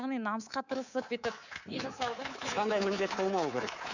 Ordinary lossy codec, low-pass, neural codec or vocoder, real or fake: none; 7.2 kHz; codec, 24 kHz, 3.1 kbps, DualCodec; fake